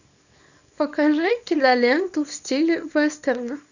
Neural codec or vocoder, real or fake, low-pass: codec, 24 kHz, 0.9 kbps, WavTokenizer, small release; fake; 7.2 kHz